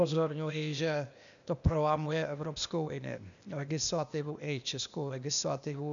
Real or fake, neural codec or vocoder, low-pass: fake; codec, 16 kHz, 0.8 kbps, ZipCodec; 7.2 kHz